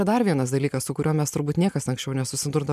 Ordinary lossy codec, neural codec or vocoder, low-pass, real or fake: MP3, 96 kbps; none; 14.4 kHz; real